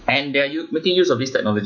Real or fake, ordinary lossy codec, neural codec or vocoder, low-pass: fake; MP3, 64 kbps; autoencoder, 48 kHz, 128 numbers a frame, DAC-VAE, trained on Japanese speech; 7.2 kHz